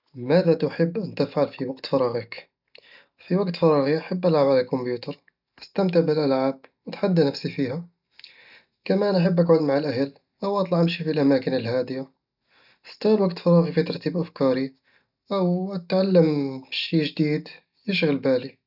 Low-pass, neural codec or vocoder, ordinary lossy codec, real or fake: 5.4 kHz; none; none; real